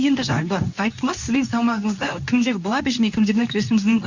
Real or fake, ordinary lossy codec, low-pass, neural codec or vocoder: fake; none; 7.2 kHz; codec, 24 kHz, 0.9 kbps, WavTokenizer, medium speech release version 2